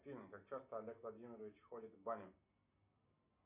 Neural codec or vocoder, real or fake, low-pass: none; real; 3.6 kHz